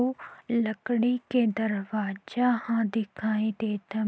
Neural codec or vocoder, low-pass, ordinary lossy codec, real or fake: none; none; none; real